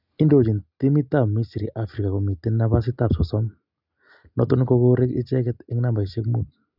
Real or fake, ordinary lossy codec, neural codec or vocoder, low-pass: real; none; none; 5.4 kHz